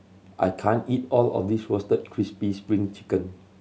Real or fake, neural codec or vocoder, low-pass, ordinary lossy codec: real; none; none; none